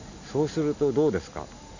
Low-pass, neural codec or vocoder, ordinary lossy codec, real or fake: 7.2 kHz; none; none; real